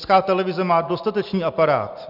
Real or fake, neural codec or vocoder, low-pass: real; none; 5.4 kHz